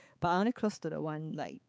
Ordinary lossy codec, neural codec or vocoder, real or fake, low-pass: none; codec, 16 kHz, 4 kbps, X-Codec, HuBERT features, trained on balanced general audio; fake; none